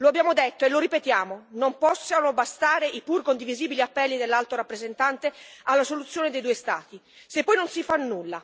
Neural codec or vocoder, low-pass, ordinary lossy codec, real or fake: none; none; none; real